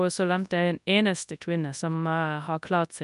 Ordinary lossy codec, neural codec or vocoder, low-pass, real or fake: none; codec, 24 kHz, 0.9 kbps, WavTokenizer, large speech release; 10.8 kHz; fake